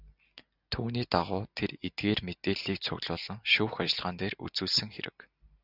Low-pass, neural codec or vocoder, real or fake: 5.4 kHz; none; real